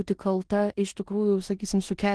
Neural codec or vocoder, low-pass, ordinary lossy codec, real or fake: codec, 16 kHz in and 24 kHz out, 0.9 kbps, LongCat-Audio-Codec, four codebook decoder; 10.8 kHz; Opus, 16 kbps; fake